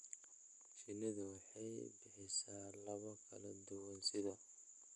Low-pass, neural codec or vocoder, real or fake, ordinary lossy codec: 10.8 kHz; none; real; none